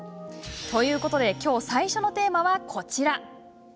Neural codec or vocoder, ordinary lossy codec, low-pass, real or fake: none; none; none; real